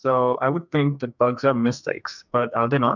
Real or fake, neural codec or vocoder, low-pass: fake; codec, 44.1 kHz, 2.6 kbps, SNAC; 7.2 kHz